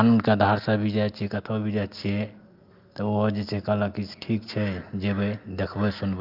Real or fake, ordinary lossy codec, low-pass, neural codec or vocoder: real; Opus, 24 kbps; 5.4 kHz; none